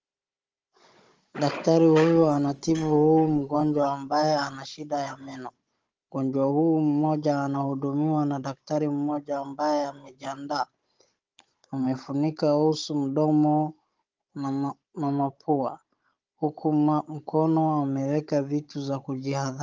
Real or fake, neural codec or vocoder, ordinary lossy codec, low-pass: fake; codec, 16 kHz, 16 kbps, FunCodec, trained on Chinese and English, 50 frames a second; Opus, 24 kbps; 7.2 kHz